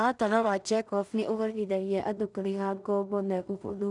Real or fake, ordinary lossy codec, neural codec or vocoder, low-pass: fake; none; codec, 16 kHz in and 24 kHz out, 0.4 kbps, LongCat-Audio-Codec, two codebook decoder; 10.8 kHz